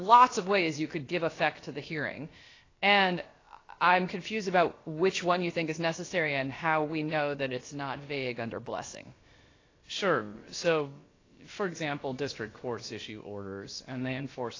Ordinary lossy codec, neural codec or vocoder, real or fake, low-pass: AAC, 32 kbps; codec, 16 kHz, about 1 kbps, DyCAST, with the encoder's durations; fake; 7.2 kHz